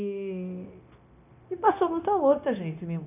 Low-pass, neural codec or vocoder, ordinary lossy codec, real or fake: 3.6 kHz; codec, 16 kHz, 0.9 kbps, LongCat-Audio-Codec; none; fake